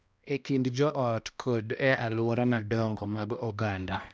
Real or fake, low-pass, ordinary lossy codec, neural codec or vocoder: fake; none; none; codec, 16 kHz, 1 kbps, X-Codec, HuBERT features, trained on balanced general audio